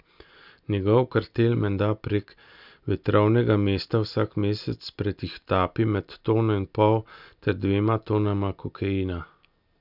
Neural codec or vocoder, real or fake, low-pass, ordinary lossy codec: none; real; 5.4 kHz; none